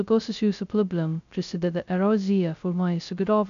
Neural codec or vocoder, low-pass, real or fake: codec, 16 kHz, 0.2 kbps, FocalCodec; 7.2 kHz; fake